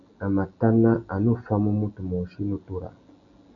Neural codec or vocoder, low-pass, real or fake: none; 7.2 kHz; real